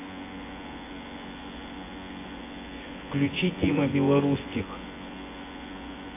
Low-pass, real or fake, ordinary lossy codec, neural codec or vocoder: 3.6 kHz; fake; AAC, 16 kbps; vocoder, 24 kHz, 100 mel bands, Vocos